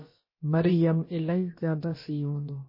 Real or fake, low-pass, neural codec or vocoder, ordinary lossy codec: fake; 5.4 kHz; codec, 16 kHz, about 1 kbps, DyCAST, with the encoder's durations; MP3, 24 kbps